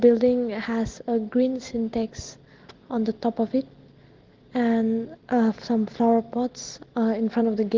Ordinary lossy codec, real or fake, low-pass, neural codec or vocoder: Opus, 16 kbps; real; 7.2 kHz; none